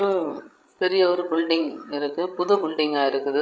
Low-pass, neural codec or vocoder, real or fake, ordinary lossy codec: none; codec, 16 kHz, 16 kbps, FreqCodec, larger model; fake; none